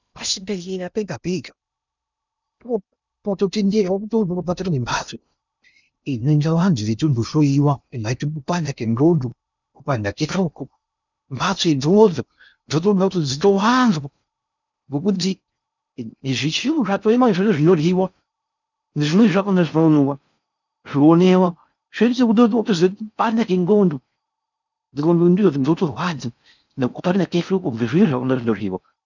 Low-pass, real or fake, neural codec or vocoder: 7.2 kHz; fake; codec, 16 kHz in and 24 kHz out, 0.6 kbps, FocalCodec, streaming, 2048 codes